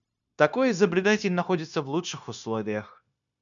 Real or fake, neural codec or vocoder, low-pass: fake; codec, 16 kHz, 0.9 kbps, LongCat-Audio-Codec; 7.2 kHz